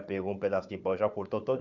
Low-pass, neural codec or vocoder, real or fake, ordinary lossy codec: 7.2 kHz; codec, 16 kHz, 4 kbps, FunCodec, trained on Chinese and English, 50 frames a second; fake; none